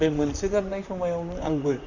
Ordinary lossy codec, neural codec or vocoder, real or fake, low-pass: none; vocoder, 44.1 kHz, 128 mel bands, Pupu-Vocoder; fake; 7.2 kHz